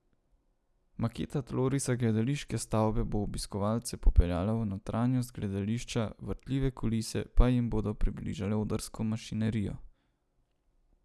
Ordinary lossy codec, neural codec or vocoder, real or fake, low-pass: none; none; real; none